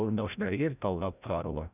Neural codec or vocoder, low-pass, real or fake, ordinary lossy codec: codec, 16 kHz, 0.5 kbps, FreqCodec, larger model; 3.6 kHz; fake; none